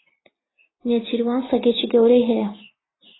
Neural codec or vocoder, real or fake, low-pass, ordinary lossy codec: none; real; 7.2 kHz; AAC, 16 kbps